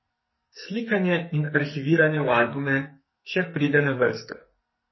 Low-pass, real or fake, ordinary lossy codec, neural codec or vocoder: 7.2 kHz; fake; MP3, 24 kbps; codec, 44.1 kHz, 2.6 kbps, SNAC